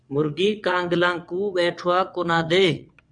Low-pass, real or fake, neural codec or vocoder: 9.9 kHz; fake; vocoder, 22.05 kHz, 80 mel bands, WaveNeXt